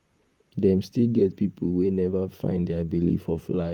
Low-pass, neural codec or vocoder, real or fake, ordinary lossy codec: 19.8 kHz; vocoder, 44.1 kHz, 128 mel bands, Pupu-Vocoder; fake; Opus, 32 kbps